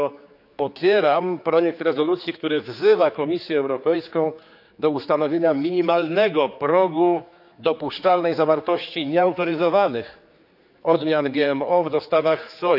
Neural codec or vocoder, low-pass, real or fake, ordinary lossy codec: codec, 16 kHz, 4 kbps, X-Codec, HuBERT features, trained on general audio; 5.4 kHz; fake; none